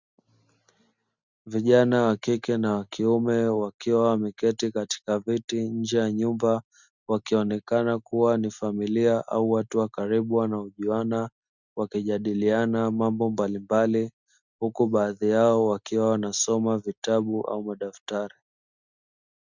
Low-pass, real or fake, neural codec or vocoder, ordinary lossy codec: 7.2 kHz; real; none; Opus, 64 kbps